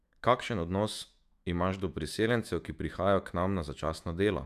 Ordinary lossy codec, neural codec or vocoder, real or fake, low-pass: AAC, 96 kbps; autoencoder, 48 kHz, 128 numbers a frame, DAC-VAE, trained on Japanese speech; fake; 14.4 kHz